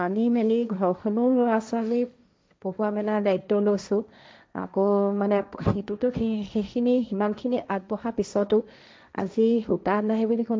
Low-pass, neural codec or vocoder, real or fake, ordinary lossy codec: none; codec, 16 kHz, 1.1 kbps, Voila-Tokenizer; fake; none